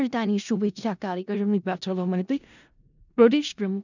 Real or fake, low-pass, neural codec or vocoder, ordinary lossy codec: fake; 7.2 kHz; codec, 16 kHz in and 24 kHz out, 0.4 kbps, LongCat-Audio-Codec, four codebook decoder; none